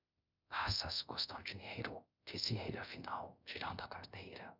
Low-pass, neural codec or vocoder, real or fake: 5.4 kHz; codec, 24 kHz, 0.5 kbps, DualCodec; fake